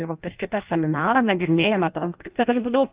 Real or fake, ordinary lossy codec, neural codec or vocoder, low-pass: fake; Opus, 24 kbps; codec, 16 kHz in and 24 kHz out, 0.6 kbps, FireRedTTS-2 codec; 3.6 kHz